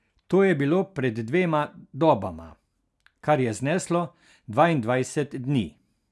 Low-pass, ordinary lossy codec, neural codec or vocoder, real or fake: none; none; none; real